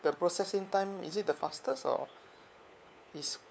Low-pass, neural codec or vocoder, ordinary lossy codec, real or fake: none; codec, 16 kHz, 4 kbps, FunCodec, trained on LibriTTS, 50 frames a second; none; fake